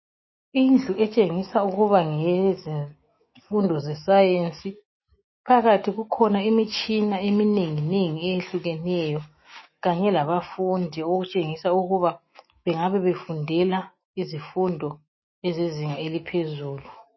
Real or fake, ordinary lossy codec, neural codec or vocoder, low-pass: fake; MP3, 24 kbps; autoencoder, 48 kHz, 128 numbers a frame, DAC-VAE, trained on Japanese speech; 7.2 kHz